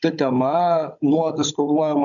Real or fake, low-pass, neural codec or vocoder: fake; 7.2 kHz; codec, 16 kHz, 16 kbps, FunCodec, trained on Chinese and English, 50 frames a second